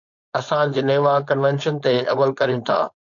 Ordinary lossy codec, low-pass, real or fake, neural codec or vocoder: AAC, 64 kbps; 7.2 kHz; fake; codec, 16 kHz, 4.8 kbps, FACodec